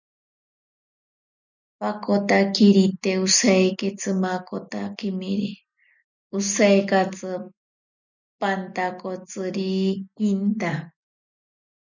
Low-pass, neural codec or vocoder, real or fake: 7.2 kHz; none; real